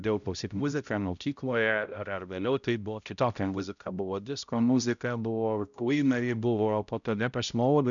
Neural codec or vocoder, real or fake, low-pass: codec, 16 kHz, 0.5 kbps, X-Codec, HuBERT features, trained on balanced general audio; fake; 7.2 kHz